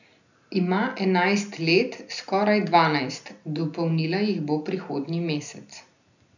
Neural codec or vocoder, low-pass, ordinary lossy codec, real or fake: none; 7.2 kHz; none; real